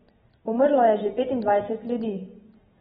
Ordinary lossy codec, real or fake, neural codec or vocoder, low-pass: AAC, 16 kbps; real; none; 19.8 kHz